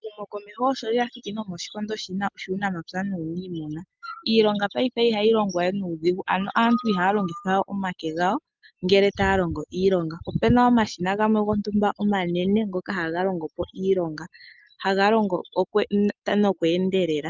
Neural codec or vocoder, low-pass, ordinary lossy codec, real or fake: none; 7.2 kHz; Opus, 32 kbps; real